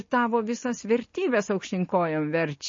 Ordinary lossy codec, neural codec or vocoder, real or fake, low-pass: MP3, 32 kbps; none; real; 7.2 kHz